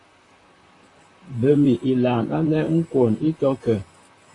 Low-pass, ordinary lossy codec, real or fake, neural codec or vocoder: 10.8 kHz; AAC, 32 kbps; fake; vocoder, 44.1 kHz, 128 mel bands, Pupu-Vocoder